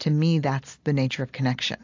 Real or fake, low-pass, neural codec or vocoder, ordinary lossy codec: real; 7.2 kHz; none; AAC, 48 kbps